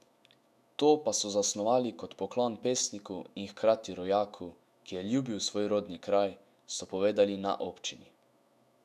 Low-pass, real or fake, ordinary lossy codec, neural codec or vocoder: 14.4 kHz; fake; none; autoencoder, 48 kHz, 128 numbers a frame, DAC-VAE, trained on Japanese speech